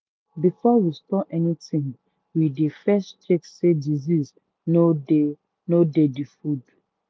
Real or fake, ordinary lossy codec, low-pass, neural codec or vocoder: real; none; none; none